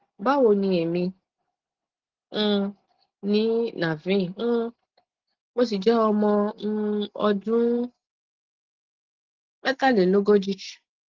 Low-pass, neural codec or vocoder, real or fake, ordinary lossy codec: 7.2 kHz; none; real; Opus, 16 kbps